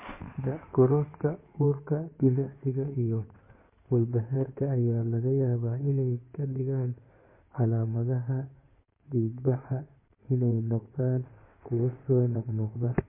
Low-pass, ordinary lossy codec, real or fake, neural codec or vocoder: 3.6 kHz; MP3, 24 kbps; fake; codec, 16 kHz in and 24 kHz out, 2.2 kbps, FireRedTTS-2 codec